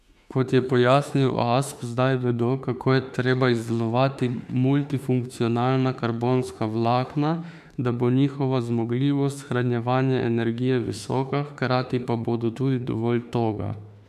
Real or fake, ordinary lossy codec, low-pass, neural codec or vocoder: fake; none; 14.4 kHz; autoencoder, 48 kHz, 32 numbers a frame, DAC-VAE, trained on Japanese speech